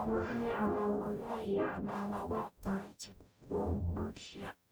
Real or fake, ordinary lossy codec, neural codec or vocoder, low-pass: fake; none; codec, 44.1 kHz, 0.9 kbps, DAC; none